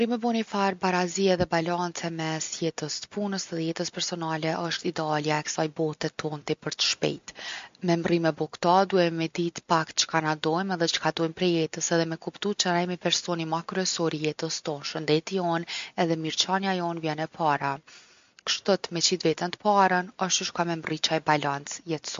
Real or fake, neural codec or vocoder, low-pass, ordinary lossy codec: real; none; 7.2 kHz; MP3, 48 kbps